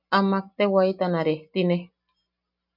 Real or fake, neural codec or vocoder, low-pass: real; none; 5.4 kHz